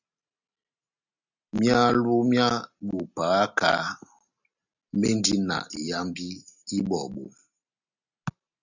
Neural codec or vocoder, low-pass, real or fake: none; 7.2 kHz; real